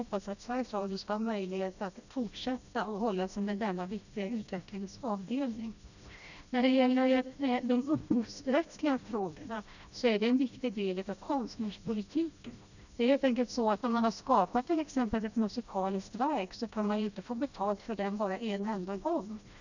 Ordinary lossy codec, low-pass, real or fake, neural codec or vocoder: none; 7.2 kHz; fake; codec, 16 kHz, 1 kbps, FreqCodec, smaller model